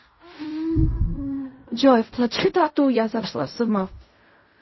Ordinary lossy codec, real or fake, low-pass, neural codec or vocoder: MP3, 24 kbps; fake; 7.2 kHz; codec, 16 kHz in and 24 kHz out, 0.4 kbps, LongCat-Audio-Codec, fine tuned four codebook decoder